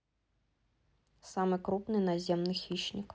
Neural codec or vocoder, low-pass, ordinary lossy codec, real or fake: none; none; none; real